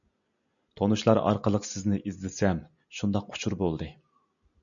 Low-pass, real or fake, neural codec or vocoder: 7.2 kHz; real; none